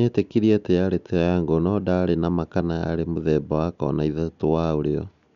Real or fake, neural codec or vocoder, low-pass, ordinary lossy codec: real; none; 7.2 kHz; none